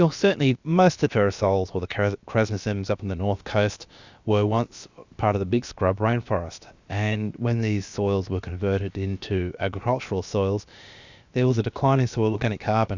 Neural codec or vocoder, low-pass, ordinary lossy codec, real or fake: codec, 16 kHz, about 1 kbps, DyCAST, with the encoder's durations; 7.2 kHz; Opus, 64 kbps; fake